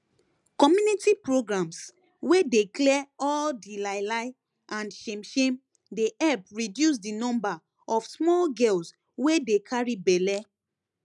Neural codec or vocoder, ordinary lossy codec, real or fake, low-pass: none; none; real; 10.8 kHz